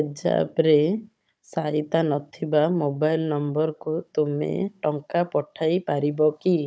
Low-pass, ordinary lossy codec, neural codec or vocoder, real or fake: none; none; codec, 16 kHz, 16 kbps, FunCodec, trained on Chinese and English, 50 frames a second; fake